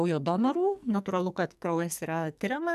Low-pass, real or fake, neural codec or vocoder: 14.4 kHz; fake; codec, 44.1 kHz, 2.6 kbps, SNAC